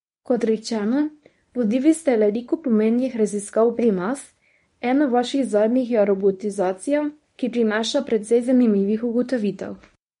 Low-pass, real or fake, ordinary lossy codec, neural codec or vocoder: 10.8 kHz; fake; MP3, 48 kbps; codec, 24 kHz, 0.9 kbps, WavTokenizer, medium speech release version 1